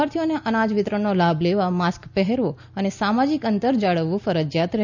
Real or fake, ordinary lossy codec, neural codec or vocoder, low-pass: real; none; none; 7.2 kHz